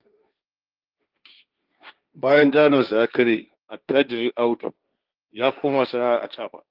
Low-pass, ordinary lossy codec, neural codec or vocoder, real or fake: 5.4 kHz; Opus, 32 kbps; codec, 16 kHz, 1.1 kbps, Voila-Tokenizer; fake